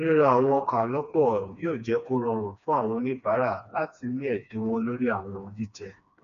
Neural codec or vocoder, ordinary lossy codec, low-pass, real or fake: codec, 16 kHz, 2 kbps, FreqCodec, smaller model; none; 7.2 kHz; fake